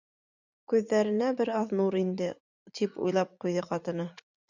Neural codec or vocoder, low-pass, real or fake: vocoder, 44.1 kHz, 80 mel bands, Vocos; 7.2 kHz; fake